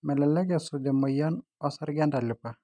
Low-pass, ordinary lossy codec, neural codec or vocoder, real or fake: 9.9 kHz; none; none; real